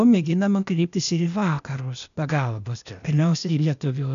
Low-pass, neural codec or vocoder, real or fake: 7.2 kHz; codec, 16 kHz, 0.8 kbps, ZipCodec; fake